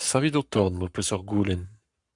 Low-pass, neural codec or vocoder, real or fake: 10.8 kHz; codec, 44.1 kHz, 7.8 kbps, Pupu-Codec; fake